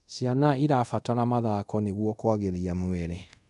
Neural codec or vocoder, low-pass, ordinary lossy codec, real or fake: codec, 24 kHz, 0.5 kbps, DualCodec; 10.8 kHz; MP3, 96 kbps; fake